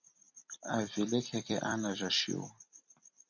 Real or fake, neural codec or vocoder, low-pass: fake; vocoder, 44.1 kHz, 128 mel bands every 256 samples, BigVGAN v2; 7.2 kHz